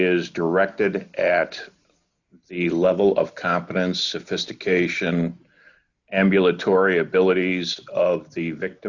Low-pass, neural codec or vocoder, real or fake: 7.2 kHz; none; real